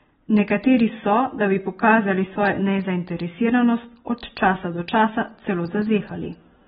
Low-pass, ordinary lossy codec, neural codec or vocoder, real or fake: 19.8 kHz; AAC, 16 kbps; none; real